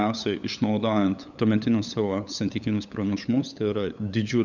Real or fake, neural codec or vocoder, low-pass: fake; codec, 16 kHz, 8 kbps, FunCodec, trained on LibriTTS, 25 frames a second; 7.2 kHz